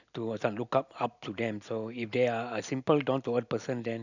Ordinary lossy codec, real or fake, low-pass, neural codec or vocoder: none; real; 7.2 kHz; none